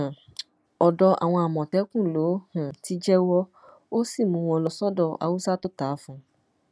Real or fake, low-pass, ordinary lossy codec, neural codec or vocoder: real; none; none; none